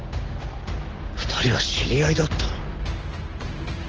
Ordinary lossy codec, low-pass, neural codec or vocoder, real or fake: Opus, 16 kbps; 7.2 kHz; none; real